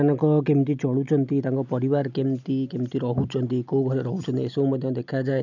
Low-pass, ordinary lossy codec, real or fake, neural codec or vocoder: 7.2 kHz; none; real; none